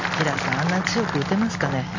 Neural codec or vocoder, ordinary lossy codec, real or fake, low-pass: none; AAC, 48 kbps; real; 7.2 kHz